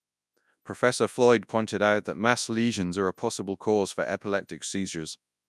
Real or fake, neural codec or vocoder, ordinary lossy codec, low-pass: fake; codec, 24 kHz, 0.9 kbps, WavTokenizer, large speech release; none; none